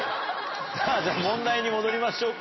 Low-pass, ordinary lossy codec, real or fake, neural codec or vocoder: 7.2 kHz; MP3, 24 kbps; real; none